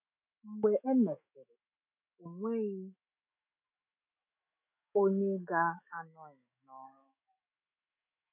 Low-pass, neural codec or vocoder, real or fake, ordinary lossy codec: 3.6 kHz; autoencoder, 48 kHz, 128 numbers a frame, DAC-VAE, trained on Japanese speech; fake; none